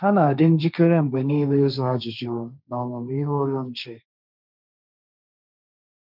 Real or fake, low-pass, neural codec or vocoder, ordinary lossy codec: fake; 5.4 kHz; codec, 16 kHz, 1.1 kbps, Voila-Tokenizer; none